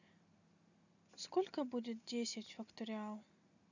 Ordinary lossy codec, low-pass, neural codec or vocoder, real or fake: none; 7.2 kHz; none; real